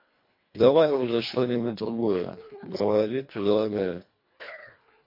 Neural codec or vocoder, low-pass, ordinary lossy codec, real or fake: codec, 24 kHz, 1.5 kbps, HILCodec; 5.4 kHz; MP3, 32 kbps; fake